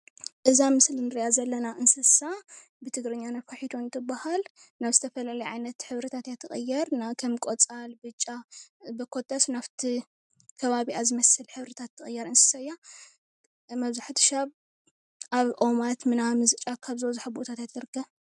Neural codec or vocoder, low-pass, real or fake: none; 10.8 kHz; real